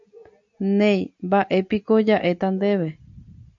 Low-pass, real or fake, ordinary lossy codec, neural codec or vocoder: 7.2 kHz; real; AAC, 64 kbps; none